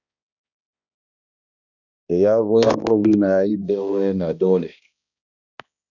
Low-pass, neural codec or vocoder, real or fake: 7.2 kHz; codec, 16 kHz, 1 kbps, X-Codec, HuBERT features, trained on balanced general audio; fake